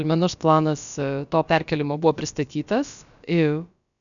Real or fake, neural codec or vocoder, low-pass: fake; codec, 16 kHz, about 1 kbps, DyCAST, with the encoder's durations; 7.2 kHz